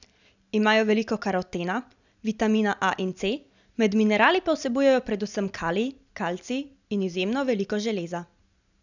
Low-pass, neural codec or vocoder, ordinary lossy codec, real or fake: 7.2 kHz; none; none; real